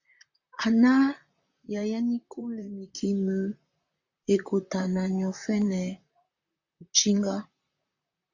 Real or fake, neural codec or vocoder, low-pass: fake; vocoder, 44.1 kHz, 128 mel bands, Pupu-Vocoder; 7.2 kHz